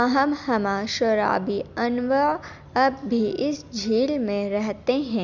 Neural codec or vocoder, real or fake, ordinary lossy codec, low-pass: none; real; Opus, 64 kbps; 7.2 kHz